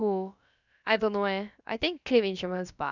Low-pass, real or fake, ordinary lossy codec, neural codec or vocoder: 7.2 kHz; fake; none; codec, 16 kHz, about 1 kbps, DyCAST, with the encoder's durations